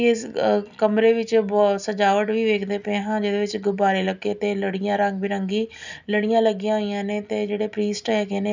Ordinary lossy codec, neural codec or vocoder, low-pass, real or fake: none; none; 7.2 kHz; real